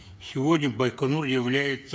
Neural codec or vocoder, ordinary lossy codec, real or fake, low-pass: codec, 16 kHz, 8 kbps, FreqCodec, smaller model; none; fake; none